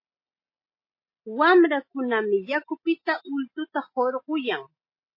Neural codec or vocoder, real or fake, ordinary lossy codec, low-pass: none; real; MP3, 24 kbps; 5.4 kHz